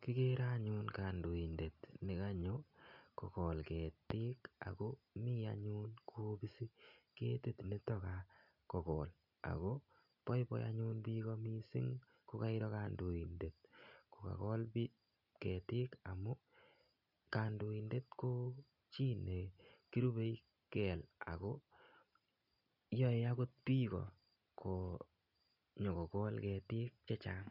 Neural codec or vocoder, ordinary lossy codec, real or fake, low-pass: none; none; real; 5.4 kHz